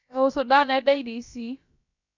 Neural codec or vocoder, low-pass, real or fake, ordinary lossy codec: codec, 16 kHz, about 1 kbps, DyCAST, with the encoder's durations; 7.2 kHz; fake; none